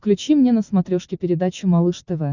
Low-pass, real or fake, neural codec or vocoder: 7.2 kHz; real; none